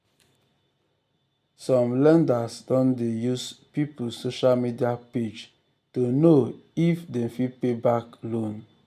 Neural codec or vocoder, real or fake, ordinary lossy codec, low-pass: none; real; none; 14.4 kHz